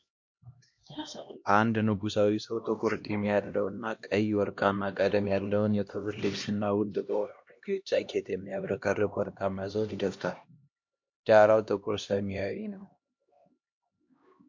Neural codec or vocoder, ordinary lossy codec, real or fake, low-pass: codec, 16 kHz, 1 kbps, X-Codec, HuBERT features, trained on LibriSpeech; MP3, 48 kbps; fake; 7.2 kHz